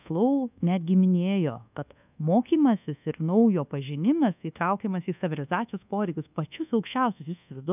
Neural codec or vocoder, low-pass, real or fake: codec, 24 kHz, 1.2 kbps, DualCodec; 3.6 kHz; fake